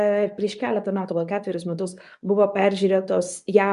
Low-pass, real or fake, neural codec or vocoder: 10.8 kHz; fake; codec, 24 kHz, 0.9 kbps, WavTokenizer, medium speech release version 2